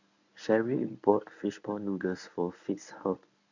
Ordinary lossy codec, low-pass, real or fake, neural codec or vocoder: none; 7.2 kHz; fake; codec, 24 kHz, 0.9 kbps, WavTokenizer, medium speech release version 1